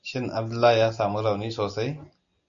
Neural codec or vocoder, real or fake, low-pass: none; real; 7.2 kHz